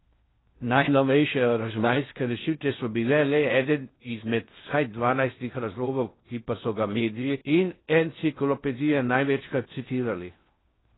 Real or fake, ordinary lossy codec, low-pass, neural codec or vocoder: fake; AAC, 16 kbps; 7.2 kHz; codec, 16 kHz in and 24 kHz out, 0.6 kbps, FocalCodec, streaming, 4096 codes